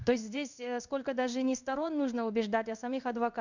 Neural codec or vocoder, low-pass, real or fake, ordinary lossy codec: codec, 16 kHz in and 24 kHz out, 1 kbps, XY-Tokenizer; 7.2 kHz; fake; none